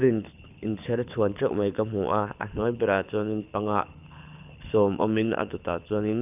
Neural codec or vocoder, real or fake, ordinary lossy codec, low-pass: codec, 24 kHz, 6 kbps, HILCodec; fake; none; 3.6 kHz